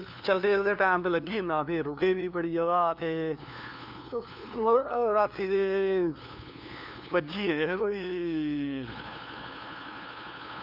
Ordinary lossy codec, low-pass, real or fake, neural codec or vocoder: Opus, 64 kbps; 5.4 kHz; fake; codec, 16 kHz, 2 kbps, FunCodec, trained on LibriTTS, 25 frames a second